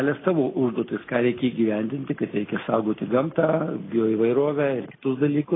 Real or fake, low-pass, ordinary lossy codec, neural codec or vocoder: real; 7.2 kHz; AAC, 16 kbps; none